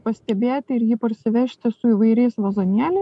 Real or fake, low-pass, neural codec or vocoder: real; 10.8 kHz; none